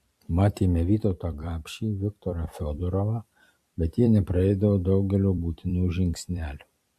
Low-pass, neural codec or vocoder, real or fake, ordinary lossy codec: 14.4 kHz; vocoder, 44.1 kHz, 128 mel bands every 256 samples, BigVGAN v2; fake; MP3, 64 kbps